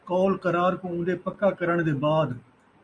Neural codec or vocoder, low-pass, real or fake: none; 9.9 kHz; real